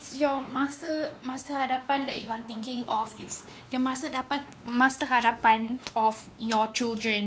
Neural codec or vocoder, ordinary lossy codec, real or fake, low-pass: codec, 16 kHz, 2 kbps, X-Codec, WavLM features, trained on Multilingual LibriSpeech; none; fake; none